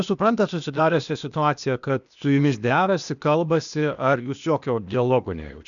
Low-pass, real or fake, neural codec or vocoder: 7.2 kHz; fake; codec, 16 kHz, 0.8 kbps, ZipCodec